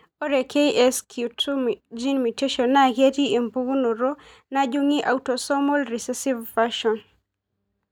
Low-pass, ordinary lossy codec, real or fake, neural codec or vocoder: 19.8 kHz; none; real; none